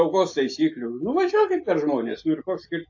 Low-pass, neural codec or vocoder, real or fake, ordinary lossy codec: 7.2 kHz; none; real; AAC, 48 kbps